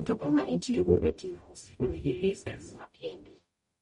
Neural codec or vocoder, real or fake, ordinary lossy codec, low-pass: codec, 44.1 kHz, 0.9 kbps, DAC; fake; MP3, 48 kbps; 19.8 kHz